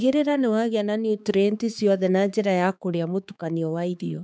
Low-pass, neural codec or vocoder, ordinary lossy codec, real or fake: none; codec, 16 kHz, 4 kbps, X-Codec, HuBERT features, trained on balanced general audio; none; fake